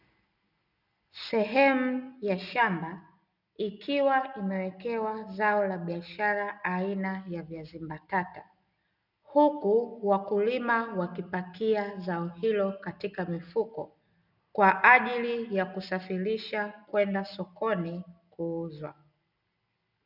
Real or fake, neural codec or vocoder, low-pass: real; none; 5.4 kHz